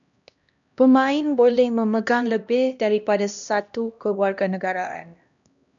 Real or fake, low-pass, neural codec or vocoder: fake; 7.2 kHz; codec, 16 kHz, 1 kbps, X-Codec, HuBERT features, trained on LibriSpeech